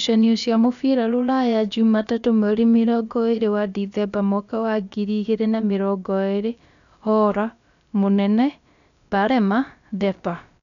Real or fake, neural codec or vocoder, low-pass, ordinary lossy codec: fake; codec, 16 kHz, about 1 kbps, DyCAST, with the encoder's durations; 7.2 kHz; none